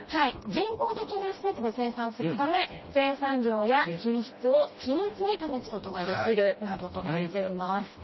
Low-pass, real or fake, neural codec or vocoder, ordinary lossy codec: 7.2 kHz; fake; codec, 16 kHz, 1 kbps, FreqCodec, smaller model; MP3, 24 kbps